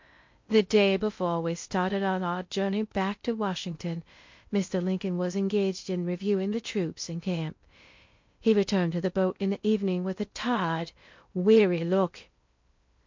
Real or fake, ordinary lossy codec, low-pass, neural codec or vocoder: fake; MP3, 48 kbps; 7.2 kHz; codec, 16 kHz in and 24 kHz out, 0.6 kbps, FocalCodec, streaming, 2048 codes